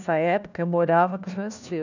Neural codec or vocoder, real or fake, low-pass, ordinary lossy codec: codec, 16 kHz, 1 kbps, FunCodec, trained on LibriTTS, 50 frames a second; fake; 7.2 kHz; none